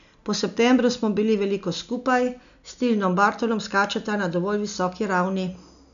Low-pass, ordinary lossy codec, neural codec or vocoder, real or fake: 7.2 kHz; none; none; real